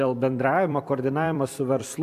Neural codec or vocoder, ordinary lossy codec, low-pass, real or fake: vocoder, 44.1 kHz, 128 mel bands every 256 samples, BigVGAN v2; AAC, 96 kbps; 14.4 kHz; fake